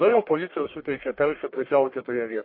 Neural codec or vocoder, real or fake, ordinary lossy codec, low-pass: codec, 44.1 kHz, 1.7 kbps, Pupu-Codec; fake; MP3, 32 kbps; 5.4 kHz